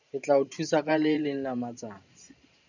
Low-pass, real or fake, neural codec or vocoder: 7.2 kHz; fake; vocoder, 44.1 kHz, 128 mel bands every 512 samples, BigVGAN v2